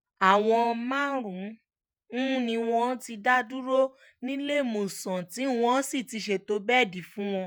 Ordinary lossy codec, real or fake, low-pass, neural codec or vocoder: none; fake; none; vocoder, 48 kHz, 128 mel bands, Vocos